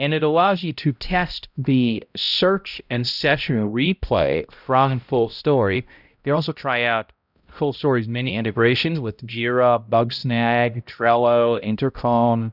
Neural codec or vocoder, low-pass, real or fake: codec, 16 kHz, 0.5 kbps, X-Codec, HuBERT features, trained on balanced general audio; 5.4 kHz; fake